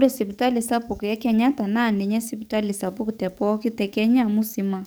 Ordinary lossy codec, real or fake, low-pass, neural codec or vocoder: none; fake; none; codec, 44.1 kHz, 7.8 kbps, DAC